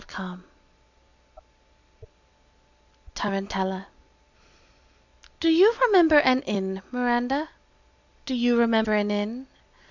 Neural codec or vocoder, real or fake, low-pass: none; real; 7.2 kHz